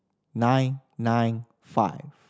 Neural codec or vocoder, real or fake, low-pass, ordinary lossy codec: none; real; none; none